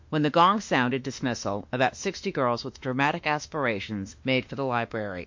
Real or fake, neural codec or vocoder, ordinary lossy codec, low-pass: fake; autoencoder, 48 kHz, 32 numbers a frame, DAC-VAE, trained on Japanese speech; MP3, 48 kbps; 7.2 kHz